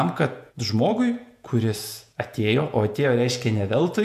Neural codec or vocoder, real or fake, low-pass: vocoder, 48 kHz, 128 mel bands, Vocos; fake; 14.4 kHz